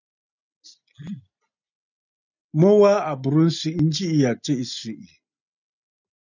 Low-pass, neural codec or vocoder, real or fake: 7.2 kHz; none; real